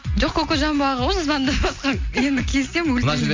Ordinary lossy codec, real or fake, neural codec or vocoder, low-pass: MP3, 48 kbps; real; none; 7.2 kHz